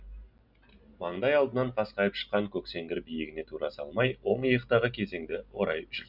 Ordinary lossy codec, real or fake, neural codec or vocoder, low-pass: none; real; none; 5.4 kHz